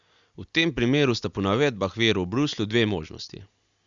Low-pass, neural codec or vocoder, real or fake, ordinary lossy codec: 7.2 kHz; none; real; Opus, 64 kbps